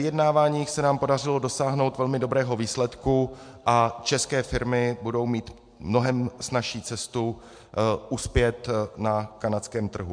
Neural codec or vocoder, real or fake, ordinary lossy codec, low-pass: none; real; MP3, 64 kbps; 9.9 kHz